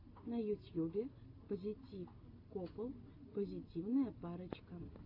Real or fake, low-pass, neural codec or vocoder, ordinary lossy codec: real; 5.4 kHz; none; AAC, 32 kbps